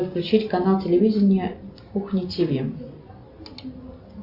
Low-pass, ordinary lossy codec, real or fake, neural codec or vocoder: 5.4 kHz; Opus, 64 kbps; real; none